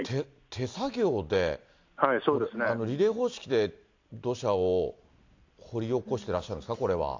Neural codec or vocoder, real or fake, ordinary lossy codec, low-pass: none; real; AAC, 48 kbps; 7.2 kHz